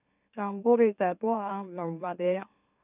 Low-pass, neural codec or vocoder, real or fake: 3.6 kHz; autoencoder, 44.1 kHz, a latent of 192 numbers a frame, MeloTTS; fake